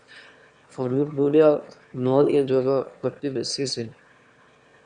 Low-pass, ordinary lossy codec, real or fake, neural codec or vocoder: 9.9 kHz; Opus, 64 kbps; fake; autoencoder, 22.05 kHz, a latent of 192 numbers a frame, VITS, trained on one speaker